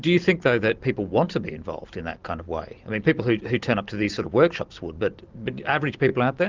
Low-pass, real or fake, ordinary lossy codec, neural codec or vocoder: 7.2 kHz; real; Opus, 32 kbps; none